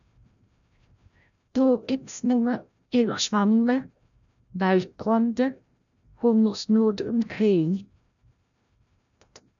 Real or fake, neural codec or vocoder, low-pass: fake; codec, 16 kHz, 0.5 kbps, FreqCodec, larger model; 7.2 kHz